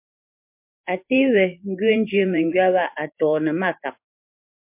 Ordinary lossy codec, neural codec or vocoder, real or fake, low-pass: MP3, 32 kbps; vocoder, 44.1 kHz, 128 mel bands every 512 samples, BigVGAN v2; fake; 3.6 kHz